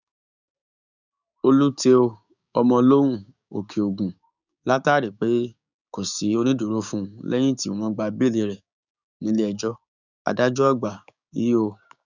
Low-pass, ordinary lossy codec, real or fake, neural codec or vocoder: 7.2 kHz; none; fake; codec, 16 kHz, 6 kbps, DAC